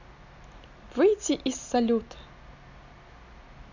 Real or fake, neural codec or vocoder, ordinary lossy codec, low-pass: real; none; none; 7.2 kHz